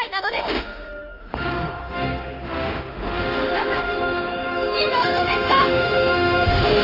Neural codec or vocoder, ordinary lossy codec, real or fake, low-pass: autoencoder, 48 kHz, 32 numbers a frame, DAC-VAE, trained on Japanese speech; Opus, 32 kbps; fake; 5.4 kHz